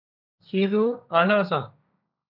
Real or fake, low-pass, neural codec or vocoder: fake; 5.4 kHz; codec, 16 kHz, 1.1 kbps, Voila-Tokenizer